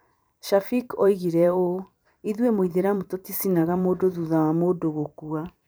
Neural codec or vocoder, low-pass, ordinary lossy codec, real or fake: vocoder, 44.1 kHz, 128 mel bands every 512 samples, BigVGAN v2; none; none; fake